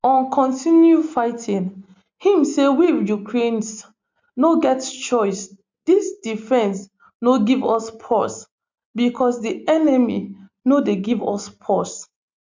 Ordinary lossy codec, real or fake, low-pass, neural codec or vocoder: MP3, 64 kbps; real; 7.2 kHz; none